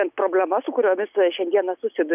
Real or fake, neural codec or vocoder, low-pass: real; none; 3.6 kHz